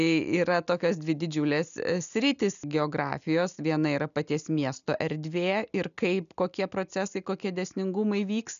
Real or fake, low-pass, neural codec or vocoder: real; 7.2 kHz; none